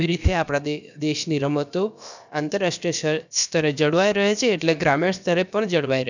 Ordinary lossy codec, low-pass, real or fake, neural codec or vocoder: none; 7.2 kHz; fake; codec, 16 kHz, about 1 kbps, DyCAST, with the encoder's durations